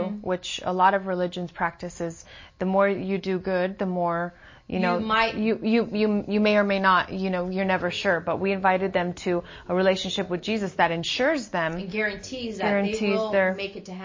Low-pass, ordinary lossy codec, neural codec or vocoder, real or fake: 7.2 kHz; MP3, 32 kbps; none; real